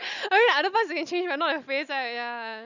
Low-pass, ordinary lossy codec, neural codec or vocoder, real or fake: 7.2 kHz; none; none; real